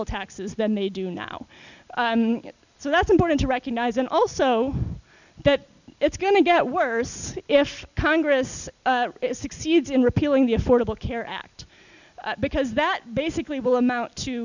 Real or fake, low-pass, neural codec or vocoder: real; 7.2 kHz; none